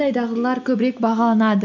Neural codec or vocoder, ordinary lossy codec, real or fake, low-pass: none; none; real; 7.2 kHz